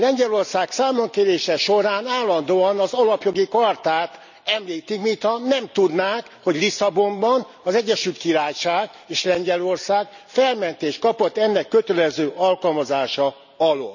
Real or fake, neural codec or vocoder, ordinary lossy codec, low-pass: real; none; none; 7.2 kHz